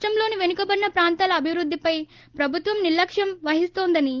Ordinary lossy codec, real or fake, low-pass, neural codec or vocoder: Opus, 16 kbps; real; 7.2 kHz; none